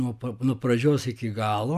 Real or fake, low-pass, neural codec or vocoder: real; 14.4 kHz; none